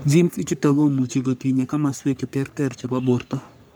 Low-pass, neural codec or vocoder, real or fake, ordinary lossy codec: none; codec, 44.1 kHz, 3.4 kbps, Pupu-Codec; fake; none